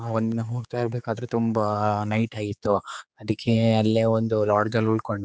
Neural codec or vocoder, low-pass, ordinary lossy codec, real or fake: codec, 16 kHz, 4 kbps, X-Codec, HuBERT features, trained on general audio; none; none; fake